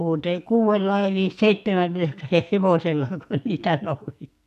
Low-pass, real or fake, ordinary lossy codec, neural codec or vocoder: 14.4 kHz; fake; none; codec, 44.1 kHz, 2.6 kbps, SNAC